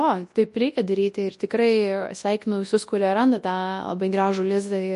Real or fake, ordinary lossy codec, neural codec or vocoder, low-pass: fake; MP3, 48 kbps; codec, 24 kHz, 0.9 kbps, WavTokenizer, large speech release; 10.8 kHz